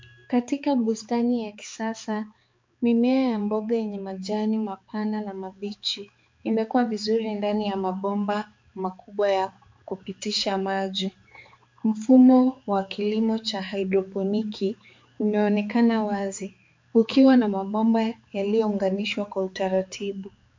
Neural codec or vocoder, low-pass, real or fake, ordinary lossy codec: codec, 16 kHz, 4 kbps, X-Codec, HuBERT features, trained on balanced general audio; 7.2 kHz; fake; MP3, 48 kbps